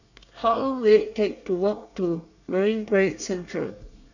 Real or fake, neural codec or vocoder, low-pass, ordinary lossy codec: fake; codec, 24 kHz, 1 kbps, SNAC; 7.2 kHz; none